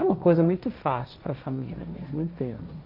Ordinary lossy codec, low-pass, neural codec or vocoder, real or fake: none; 5.4 kHz; codec, 16 kHz, 1.1 kbps, Voila-Tokenizer; fake